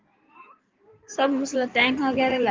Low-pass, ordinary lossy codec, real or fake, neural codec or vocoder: 7.2 kHz; Opus, 32 kbps; fake; codec, 16 kHz in and 24 kHz out, 2.2 kbps, FireRedTTS-2 codec